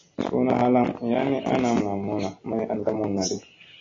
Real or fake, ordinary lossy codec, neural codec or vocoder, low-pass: real; AAC, 32 kbps; none; 7.2 kHz